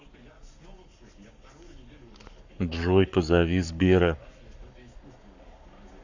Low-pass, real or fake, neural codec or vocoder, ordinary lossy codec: 7.2 kHz; fake; codec, 44.1 kHz, 7.8 kbps, Pupu-Codec; Opus, 64 kbps